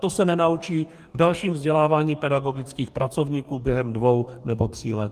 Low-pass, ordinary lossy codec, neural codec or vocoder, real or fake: 14.4 kHz; Opus, 32 kbps; codec, 44.1 kHz, 2.6 kbps, DAC; fake